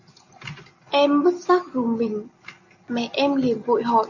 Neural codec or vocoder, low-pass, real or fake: none; 7.2 kHz; real